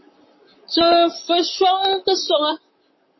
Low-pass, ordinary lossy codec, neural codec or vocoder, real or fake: 7.2 kHz; MP3, 24 kbps; vocoder, 44.1 kHz, 128 mel bands, Pupu-Vocoder; fake